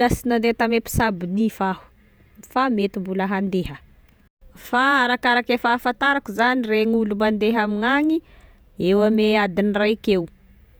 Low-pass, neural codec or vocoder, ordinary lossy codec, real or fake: none; vocoder, 48 kHz, 128 mel bands, Vocos; none; fake